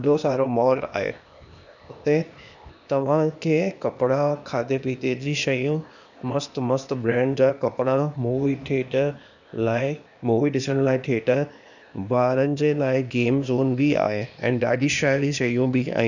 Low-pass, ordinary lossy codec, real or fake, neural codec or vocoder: 7.2 kHz; none; fake; codec, 16 kHz, 0.8 kbps, ZipCodec